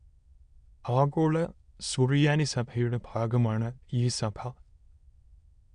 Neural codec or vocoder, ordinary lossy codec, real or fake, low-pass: autoencoder, 22.05 kHz, a latent of 192 numbers a frame, VITS, trained on many speakers; MP3, 64 kbps; fake; 9.9 kHz